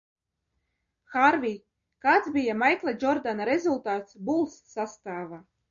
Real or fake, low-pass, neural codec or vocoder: real; 7.2 kHz; none